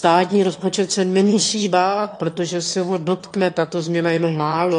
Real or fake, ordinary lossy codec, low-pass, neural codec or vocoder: fake; AAC, 48 kbps; 9.9 kHz; autoencoder, 22.05 kHz, a latent of 192 numbers a frame, VITS, trained on one speaker